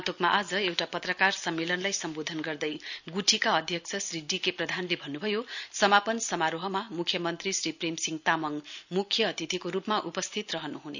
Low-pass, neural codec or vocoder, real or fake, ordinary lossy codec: 7.2 kHz; none; real; none